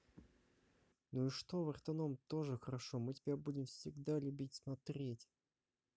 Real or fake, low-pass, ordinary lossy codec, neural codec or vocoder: real; none; none; none